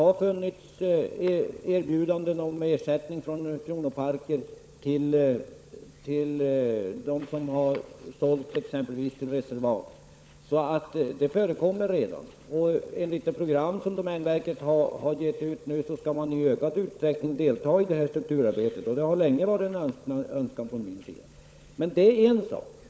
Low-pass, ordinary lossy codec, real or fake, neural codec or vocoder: none; none; fake; codec, 16 kHz, 16 kbps, FunCodec, trained on LibriTTS, 50 frames a second